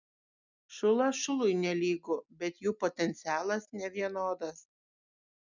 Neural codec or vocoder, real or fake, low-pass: none; real; 7.2 kHz